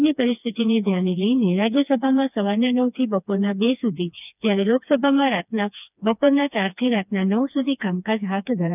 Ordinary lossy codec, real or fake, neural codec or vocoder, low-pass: none; fake; codec, 16 kHz, 2 kbps, FreqCodec, smaller model; 3.6 kHz